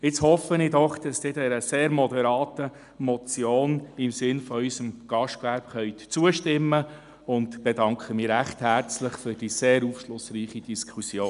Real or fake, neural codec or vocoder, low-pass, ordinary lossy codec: real; none; 10.8 kHz; MP3, 96 kbps